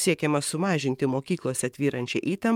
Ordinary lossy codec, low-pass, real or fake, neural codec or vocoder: MP3, 96 kbps; 19.8 kHz; fake; codec, 44.1 kHz, 7.8 kbps, DAC